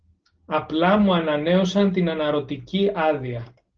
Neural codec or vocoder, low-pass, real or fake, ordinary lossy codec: none; 7.2 kHz; real; Opus, 16 kbps